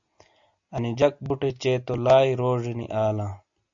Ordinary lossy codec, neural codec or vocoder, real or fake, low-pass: AAC, 64 kbps; none; real; 7.2 kHz